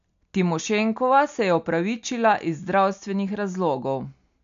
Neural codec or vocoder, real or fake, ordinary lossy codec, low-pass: none; real; MP3, 64 kbps; 7.2 kHz